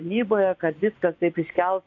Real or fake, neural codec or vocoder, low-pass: fake; vocoder, 24 kHz, 100 mel bands, Vocos; 7.2 kHz